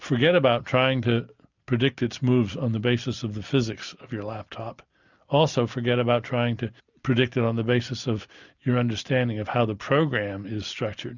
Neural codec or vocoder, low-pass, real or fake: none; 7.2 kHz; real